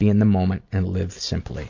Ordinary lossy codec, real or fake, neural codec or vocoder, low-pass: MP3, 48 kbps; real; none; 7.2 kHz